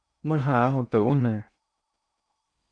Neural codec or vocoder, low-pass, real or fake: codec, 16 kHz in and 24 kHz out, 0.8 kbps, FocalCodec, streaming, 65536 codes; 9.9 kHz; fake